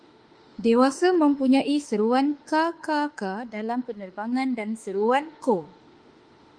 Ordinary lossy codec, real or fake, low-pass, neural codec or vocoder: Opus, 24 kbps; fake; 9.9 kHz; autoencoder, 48 kHz, 32 numbers a frame, DAC-VAE, trained on Japanese speech